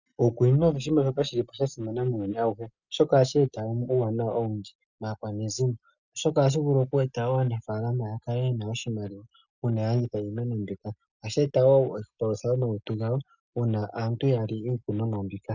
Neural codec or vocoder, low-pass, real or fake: none; 7.2 kHz; real